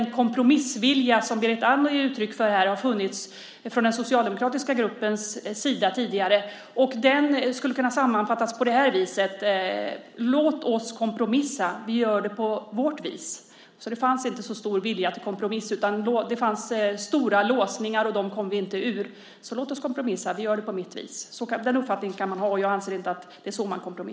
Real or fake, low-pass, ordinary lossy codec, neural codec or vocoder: real; none; none; none